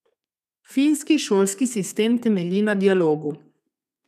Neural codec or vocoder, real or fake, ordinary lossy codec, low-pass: codec, 32 kHz, 1.9 kbps, SNAC; fake; none; 14.4 kHz